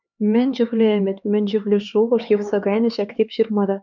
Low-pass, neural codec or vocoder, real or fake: 7.2 kHz; codec, 16 kHz, 4 kbps, X-Codec, HuBERT features, trained on LibriSpeech; fake